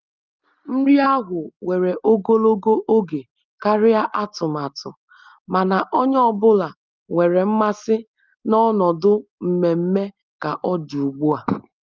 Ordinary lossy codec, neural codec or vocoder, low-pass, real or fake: Opus, 24 kbps; none; 7.2 kHz; real